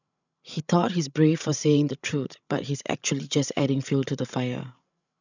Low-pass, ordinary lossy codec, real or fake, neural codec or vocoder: 7.2 kHz; none; fake; codec, 16 kHz, 16 kbps, FreqCodec, larger model